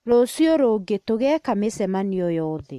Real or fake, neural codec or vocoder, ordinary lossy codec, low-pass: real; none; MP3, 64 kbps; 14.4 kHz